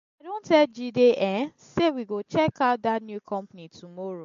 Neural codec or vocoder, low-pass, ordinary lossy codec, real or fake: none; 7.2 kHz; MP3, 48 kbps; real